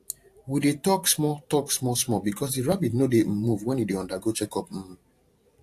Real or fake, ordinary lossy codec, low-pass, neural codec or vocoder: fake; AAC, 64 kbps; 14.4 kHz; vocoder, 44.1 kHz, 128 mel bands every 512 samples, BigVGAN v2